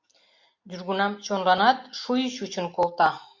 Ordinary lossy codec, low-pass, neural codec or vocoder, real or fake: MP3, 48 kbps; 7.2 kHz; none; real